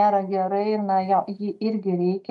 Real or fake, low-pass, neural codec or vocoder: real; 10.8 kHz; none